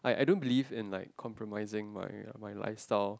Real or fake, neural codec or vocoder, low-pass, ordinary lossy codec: real; none; none; none